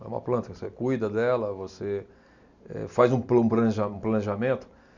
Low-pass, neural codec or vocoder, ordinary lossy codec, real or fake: 7.2 kHz; none; none; real